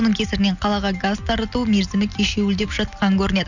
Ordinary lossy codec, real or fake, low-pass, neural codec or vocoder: none; real; 7.2 kHz; none